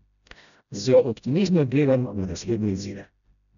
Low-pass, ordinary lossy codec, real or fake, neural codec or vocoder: 7.2 kHz; none; fake; codec, 16 kHz, 0.5 kbps, FreqCodec, smaller model